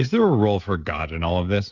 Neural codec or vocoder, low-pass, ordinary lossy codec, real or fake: codec, 16 kHz, 16 kbps, FreqCodec, smaller model; 7.2 kHz; Opus, 64 kbps; fake